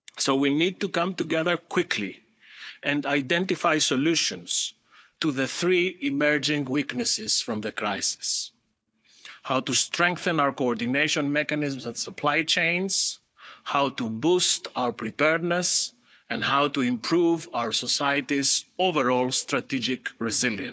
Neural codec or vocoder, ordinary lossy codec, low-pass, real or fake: codec, 16 kHz, 4 kbps, FunCodec, trained on Chinese and English, 50 frames a second; none; none; fake